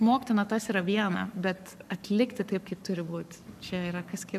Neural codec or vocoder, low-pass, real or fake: codec, 44.1 kHz, 7.8 kbps, Pupu-Codec; 14.4 kHz; fake